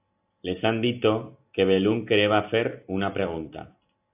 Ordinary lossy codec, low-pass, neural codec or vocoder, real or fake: AAC, 32 kbps; 3.6 kHz; none; real